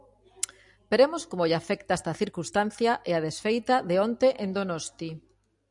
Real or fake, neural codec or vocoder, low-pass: real; none; 10.8 kHz